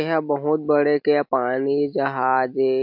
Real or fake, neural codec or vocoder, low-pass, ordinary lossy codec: real; none; 5.4 kHz; MP3, 48 kbps